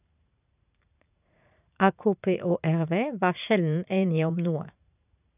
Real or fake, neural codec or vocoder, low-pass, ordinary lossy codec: fake; vocoder, 44.1 kHz, 80 mel bands, Vocos; 3.6 kHz; none